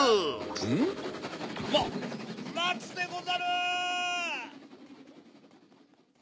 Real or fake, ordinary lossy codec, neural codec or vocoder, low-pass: real; none; none; none